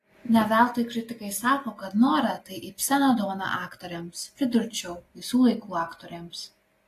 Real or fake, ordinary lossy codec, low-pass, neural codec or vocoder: real; AAC, 48 kbps; 14.4 kHz; none